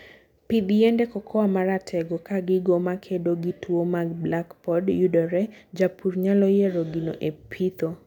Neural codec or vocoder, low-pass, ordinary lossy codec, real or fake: none; 19.8 kHz; none; real